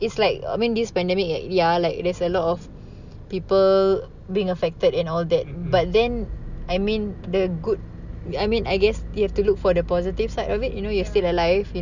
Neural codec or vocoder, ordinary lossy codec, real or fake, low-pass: none; none; real; 7.2 kHz